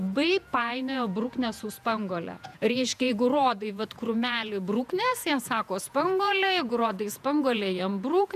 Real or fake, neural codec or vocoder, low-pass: fake; vocoder, 48 kHz, 128 mel bands, Vocos; 14.4 kHz